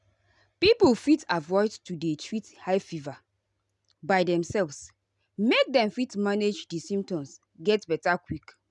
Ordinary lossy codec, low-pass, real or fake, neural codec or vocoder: none; 10.8 kHz; real; none